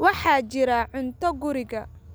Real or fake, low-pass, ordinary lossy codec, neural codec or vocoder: real; none; none; none